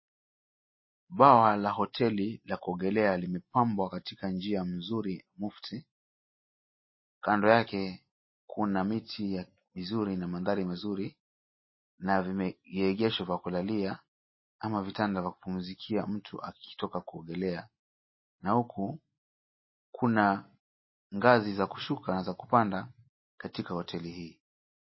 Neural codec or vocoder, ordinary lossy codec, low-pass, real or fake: none; MP3, 24 kbps; 7.2 kHz; real